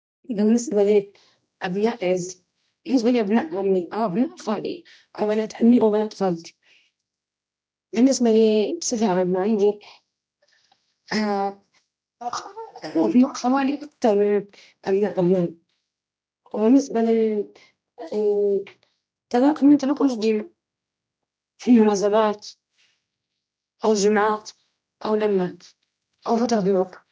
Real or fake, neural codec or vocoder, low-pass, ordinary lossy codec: fake; codec, 16 kHz, 1 kbps, X-Codec, HuBERT features, trained on general audio; none; none